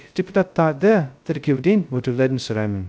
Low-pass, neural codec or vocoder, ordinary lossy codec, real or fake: none; codec, 16 kHz, 0.2 kbps, FocalCodec; none; fake